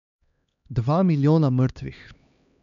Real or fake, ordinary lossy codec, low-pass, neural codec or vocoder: fake; none; 7.2 kHz; codec, 16 kHz, 2 kbps, X-Codec, HuBERT features, trained on LibriSpeech